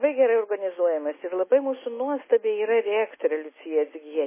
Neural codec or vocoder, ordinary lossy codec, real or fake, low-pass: none; MP3, 16 kbps; real; 3.6 kHz